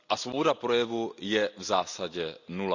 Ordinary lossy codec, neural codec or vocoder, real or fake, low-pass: none; none; real; 7.2 kHz